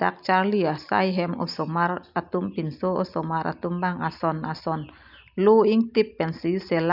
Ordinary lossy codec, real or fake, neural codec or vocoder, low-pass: none; real; none; 5.4 kHz